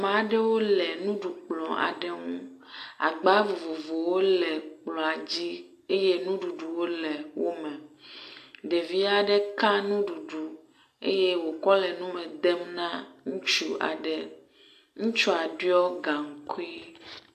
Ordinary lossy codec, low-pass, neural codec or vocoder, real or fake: AAC, 64 kbps; 14.4 kHz; none; real